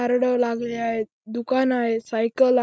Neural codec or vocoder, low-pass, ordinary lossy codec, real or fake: none; none; none; real